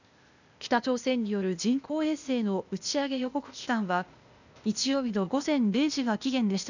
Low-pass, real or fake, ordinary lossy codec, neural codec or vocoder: 7.2 kHz; fake; none; codec, 16 kHz, 0.8 kbps, ZipCodec